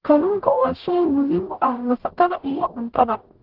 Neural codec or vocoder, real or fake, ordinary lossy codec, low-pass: codec, 44.1 kHz, 0.9 kbps, DAC; fake; Opus, 16 kbps; 5.4 kHz